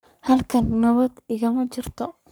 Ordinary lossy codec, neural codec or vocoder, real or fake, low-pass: none; codec, 44.1 kHz, 3.4 kbps, Pupu-Codec; fake; none